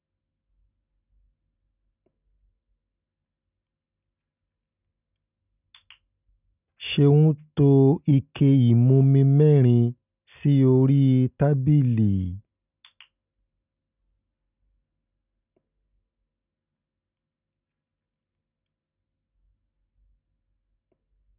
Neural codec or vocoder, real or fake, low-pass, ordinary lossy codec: none; real; 3.6 kHz; none